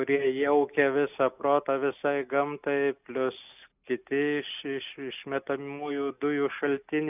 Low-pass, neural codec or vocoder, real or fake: 3.6 kHz; none; real